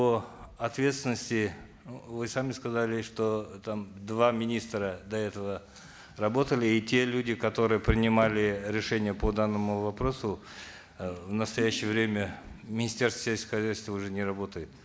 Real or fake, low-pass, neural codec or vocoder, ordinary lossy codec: real; none; none; none